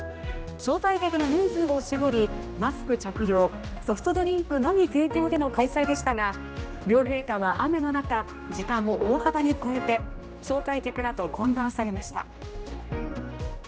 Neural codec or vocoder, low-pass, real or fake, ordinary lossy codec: codec, 16 kHz, 1 kbps, X-Codec, HuBERT features, trained on general audio; none; fake; none